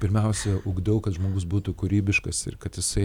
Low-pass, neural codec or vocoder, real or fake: 19.8 kHz; none; real